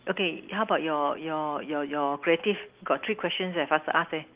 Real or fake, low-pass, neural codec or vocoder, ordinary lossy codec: real; 3.6 kHz; none; Opus, 32 kbps